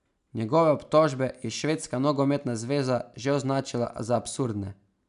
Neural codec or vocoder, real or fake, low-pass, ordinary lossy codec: none; real; 10.8 kHz; none